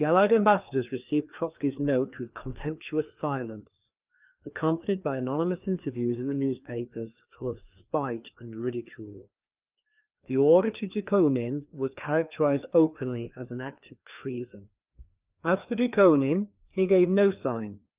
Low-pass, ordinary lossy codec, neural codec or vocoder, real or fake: 3.6 kHz; Opus, 24 kbps; codec, 16 kHz, 2 kbps, FreqCodec, larger model; fake